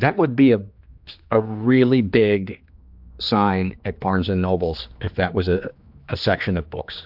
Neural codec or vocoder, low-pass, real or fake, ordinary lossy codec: codec, 16 kHz, 2 kbps, X-Codec, HuBERT features, trained on general audio; 5.4 kHz; fake; AAC, 48 kbps